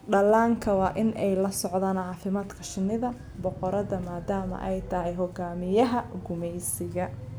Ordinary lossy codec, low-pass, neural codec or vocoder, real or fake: none; none; none; real